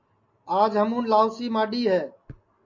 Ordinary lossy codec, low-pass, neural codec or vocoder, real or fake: MP3, 48 kbps; 7.2 kHz; none; real